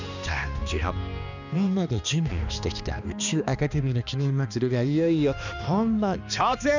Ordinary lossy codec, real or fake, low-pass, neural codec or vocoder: none; fake; 7.2 kHz; codec, 16 kHz, 2 kbps, X-Codec, HuBERT features, trained on balanced general audio